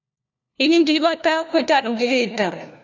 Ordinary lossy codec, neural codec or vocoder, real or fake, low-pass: AAC, 48 kbps; codec, 16 kHz, 1 kbps, FunCodec, trained on LibriTTS, 50 frames a second; fake; 7.2 kHz